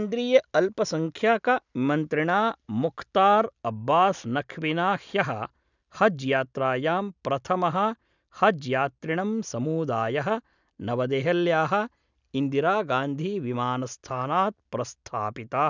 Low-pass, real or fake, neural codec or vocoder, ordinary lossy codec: 7.2 kHz; real; none; none